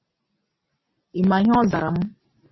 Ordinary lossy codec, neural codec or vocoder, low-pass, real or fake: MP3, 24 kbps; none; 7.2 kHz; real